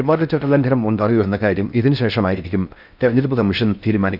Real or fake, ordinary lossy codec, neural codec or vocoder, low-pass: fake; none; codec, 16 kHz in and 24 kHz out, 0.6 kbps, FocalCodec, streaming, 4096 codes; 5.4 kHz